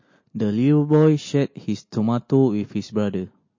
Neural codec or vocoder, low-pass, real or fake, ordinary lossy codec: none; 7.2 kHz; real; MP3, 32 kbps